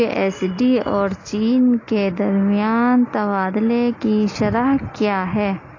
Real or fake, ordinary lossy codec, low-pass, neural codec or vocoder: real; AAC, 32 kbps; 7.2 kHz; none